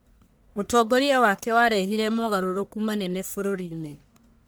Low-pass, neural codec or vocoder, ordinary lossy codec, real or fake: none; codec, 44.1 kHz, 1.7 kbps, Pupu-Codec; none; fake